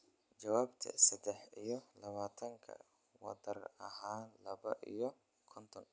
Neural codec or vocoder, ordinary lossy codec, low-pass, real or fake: none; none; none; real